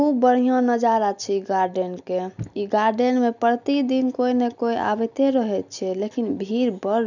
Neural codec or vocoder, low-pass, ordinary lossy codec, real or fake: none; 7.2 kHz; none; real